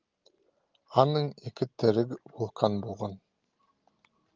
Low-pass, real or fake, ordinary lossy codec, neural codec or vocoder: 7.2 kHz; real; Opus, 32 kbps; none